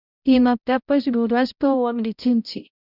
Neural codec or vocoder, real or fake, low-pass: codec, 16 kHz, 0.5 kbps, X-Codec, HuBERT features, trained on balanced general audio; fake; 5.4 kHz